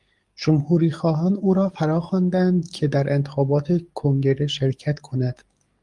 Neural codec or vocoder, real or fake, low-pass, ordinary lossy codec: codec, 44.1 kHz, 7.8 kbps, DAC; fake; 10.8 kHz; Opus, 32 kbps